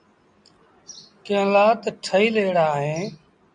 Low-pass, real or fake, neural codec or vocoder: 9.9 kHz; real; none